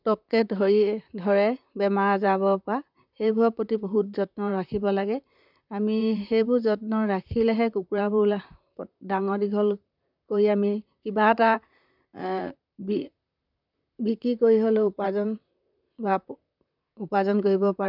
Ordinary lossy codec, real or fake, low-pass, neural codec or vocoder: none; fake; 5.4 kHz; vocoder, 44.1 kHz, 128 mel bands, Pupu-Vocoder